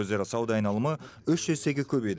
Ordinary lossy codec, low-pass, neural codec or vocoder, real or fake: none; none; none; real